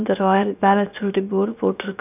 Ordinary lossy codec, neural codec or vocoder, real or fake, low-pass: none; codec, 16 kHz, 0.7 kbps, FocalCodec; fake; 3.6 kHz